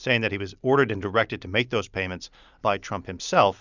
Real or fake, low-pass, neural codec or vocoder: real; 7.2 kHz; none